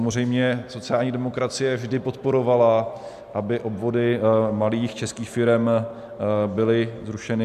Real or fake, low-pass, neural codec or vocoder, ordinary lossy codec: real; 14.4 kHz; none; MP3, 96 kbps